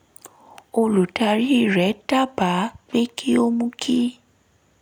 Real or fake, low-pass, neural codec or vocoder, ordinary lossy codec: real; none; none; none